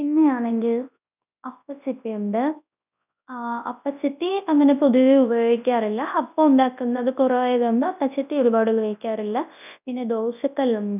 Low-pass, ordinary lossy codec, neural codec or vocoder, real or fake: 3.6 kHz; none; codec, 24 kHz, 0.9 kbps, WavTokenizer, large speech release; fake